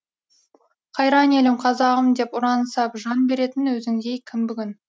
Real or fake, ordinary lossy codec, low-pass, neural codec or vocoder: real; none; none; none